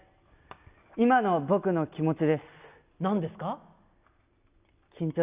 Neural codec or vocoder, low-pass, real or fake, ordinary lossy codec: none; 3.6 kHz; real; Opus, 64 kbps